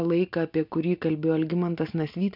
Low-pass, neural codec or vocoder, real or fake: 5.4 kHz; none; real